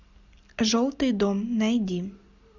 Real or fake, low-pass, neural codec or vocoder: real; 7.2 kHz; none